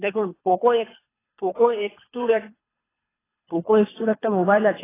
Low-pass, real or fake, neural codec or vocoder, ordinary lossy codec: 3.6 kHz; fake; codec, 24 kHz, 3 kbps, HILCodec; AAC, 16 kbps